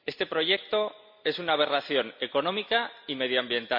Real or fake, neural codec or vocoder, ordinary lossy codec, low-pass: real; none; MP3, 48 kbps; 5.4 kHz